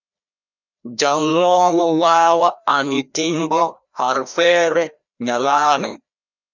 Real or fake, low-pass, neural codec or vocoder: fake; 7.2 kHz; codec, 16 kHz, 1 kbps, FreqCodec, larger model